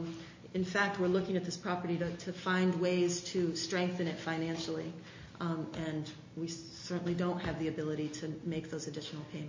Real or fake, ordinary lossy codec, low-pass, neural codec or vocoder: real; MP3, 32 kbps; 7.2 kHz; none